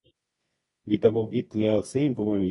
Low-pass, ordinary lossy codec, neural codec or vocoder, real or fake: 10.8 kHz; AAC, 32 kbps; codec, 24 kHz, 0.9 kbps, WavTokenizer, medium music audio release; fake